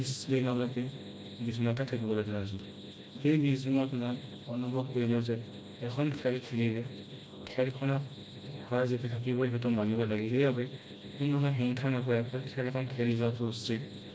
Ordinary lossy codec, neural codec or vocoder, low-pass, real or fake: none; codec, 16 kHz, 1 kbps, FreqCodec, smaller model; none; fake